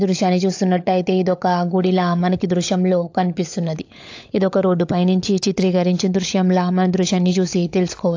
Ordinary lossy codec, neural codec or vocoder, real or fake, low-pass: AAC, 48 kbps; codec, 16 kHz, 16 kbps, FunCodec, trained on LibriTTS, 50 frames a second; fake; 7.2 kHz